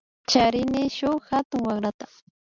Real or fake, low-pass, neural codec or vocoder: real; 7.2 kHz; none